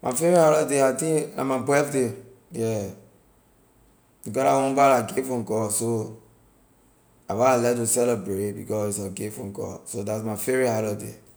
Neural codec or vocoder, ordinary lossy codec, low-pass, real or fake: none; none; none; real